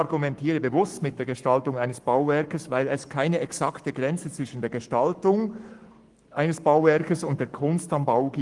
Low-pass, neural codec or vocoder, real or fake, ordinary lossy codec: 10.8 kHz; codec, 44.1 kHz, 7.8 kbps, Pupu-Codec; fake; Opus, 24 kbps